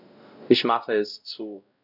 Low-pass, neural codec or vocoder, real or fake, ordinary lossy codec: 5.4 kHz; codec, 16 kHz, 0.5 kbps, X-Codec, WavLM features, trained on Multilingual LibriSpeech; fake; none